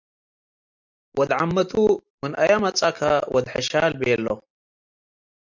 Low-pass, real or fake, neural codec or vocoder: 7.2 kHz; real; none